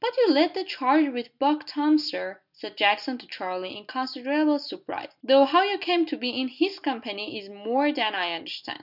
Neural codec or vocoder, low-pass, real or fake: none; 5.4 kHz; real